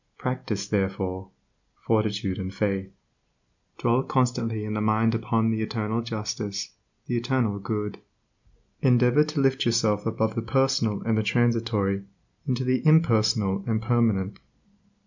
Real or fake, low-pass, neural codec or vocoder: real; 7.2 kHz; none